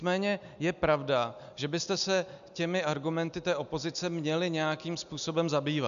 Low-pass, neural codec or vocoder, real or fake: 7.2 kHz; none; real